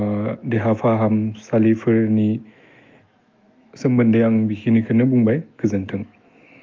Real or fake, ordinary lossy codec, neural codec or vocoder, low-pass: fake; Opus, 32 kbps; autoencoder, 48 kHz, 128 numbers a frame, DAC-VAE, trained on Japanese speech; 7.2 kHz